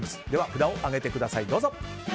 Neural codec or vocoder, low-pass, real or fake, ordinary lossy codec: none; none; real; none